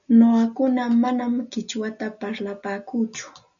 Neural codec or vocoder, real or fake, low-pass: none; real; 7.2 kHz